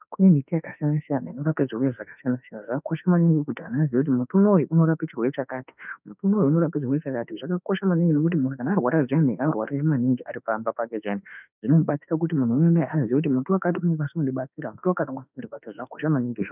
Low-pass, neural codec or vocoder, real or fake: 3.6 kHz; autoencoder, 48 kHz, 32 numbers a frame, DAC-VAE, trained on Japanese speech; fake